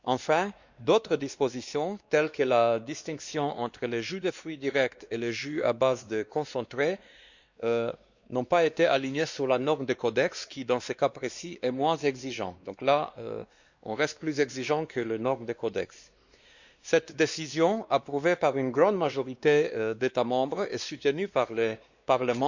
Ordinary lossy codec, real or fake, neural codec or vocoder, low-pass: Opus, 64 kbps; fake; codec, 16 kHz, 2 kbps, X-Codec, WavLM features, trained on Multilingual LibriSpeech; 7.2 kHz